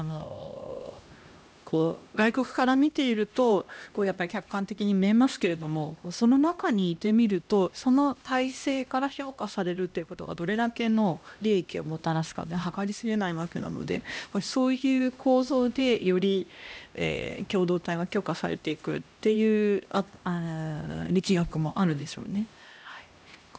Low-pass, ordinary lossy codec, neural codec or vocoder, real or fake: none; none; codec, 16 kHz, 1 kbps, X-Codec, HuBERT features, trained on LibriSpeech; fake